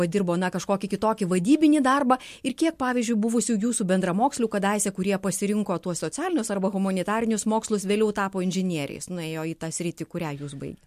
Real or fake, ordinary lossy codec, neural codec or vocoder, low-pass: real; MP3, 64 kbps; none; 14.4 kHz